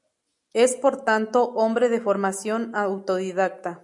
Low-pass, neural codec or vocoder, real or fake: 10.8 kHz; none; real